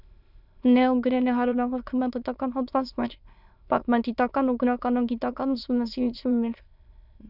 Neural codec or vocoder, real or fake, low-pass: autoencoder, 22.05 kHz, a latent of 192 numbers a frame, VITS, trained on many speakers; fake; 5.4 kHz